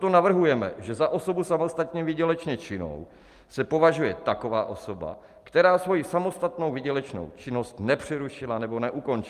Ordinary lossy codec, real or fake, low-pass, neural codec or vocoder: Opus, 32 kbps; real; 14.4 kHz; none